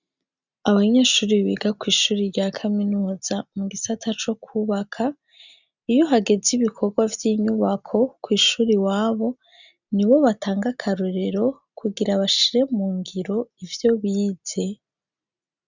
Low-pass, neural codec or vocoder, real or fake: 7.2 kHz; none; real